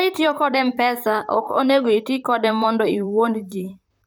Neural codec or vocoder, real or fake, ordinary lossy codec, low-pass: vocoder, 44.1 kHz, 128 mel bands, Pupu-Vocoder; fake; none; none